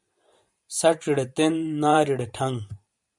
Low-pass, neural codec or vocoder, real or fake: 10.8 kHz; vocoder, 44.1 kHz, 128 mel bands every 512 samples, BigVGAN v2; fake